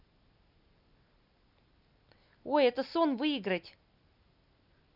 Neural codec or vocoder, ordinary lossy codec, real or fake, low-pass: none; none; real; 5.4 kHz